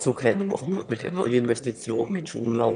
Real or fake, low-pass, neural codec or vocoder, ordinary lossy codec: fake; 9.9 kHz; autoencoder, 22.05 kHz, a latent of 192 numbers a frame, VITS, trained on one speaker; none